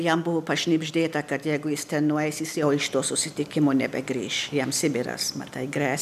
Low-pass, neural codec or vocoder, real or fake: 14.4 kHz; none; real